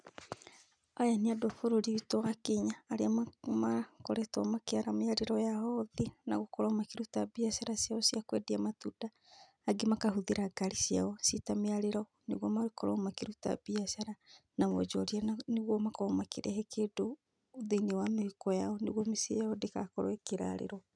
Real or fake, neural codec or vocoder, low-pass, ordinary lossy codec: real; none; 9.9 kHz; none